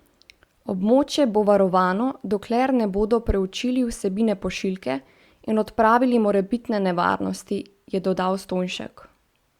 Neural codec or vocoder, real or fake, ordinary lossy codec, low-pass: none; real; Opus, 64 kbps; 19.8 kHz